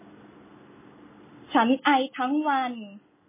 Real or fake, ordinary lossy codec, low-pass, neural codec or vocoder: real; MP3, 16 kbps; 3.6 kHz; none